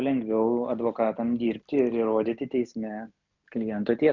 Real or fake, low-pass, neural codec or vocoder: real; 7.2 kHz; none